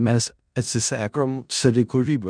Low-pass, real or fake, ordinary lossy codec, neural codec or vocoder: 9.9 kHz; fake; MP3, 96 kbps; codec, 16 kHz in and 24 kHz out, 0.4 kbps, LongCat-Audio-Codec, four codebook decoder